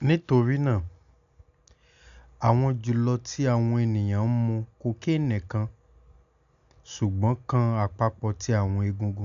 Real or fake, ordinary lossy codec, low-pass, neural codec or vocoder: real; none; 7.2 kHz; none